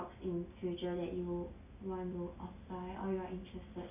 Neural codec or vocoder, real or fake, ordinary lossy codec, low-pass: none; real; none; 3.6 kHz